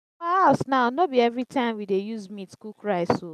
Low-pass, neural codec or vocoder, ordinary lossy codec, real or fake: 14.4 kHz; none; none; real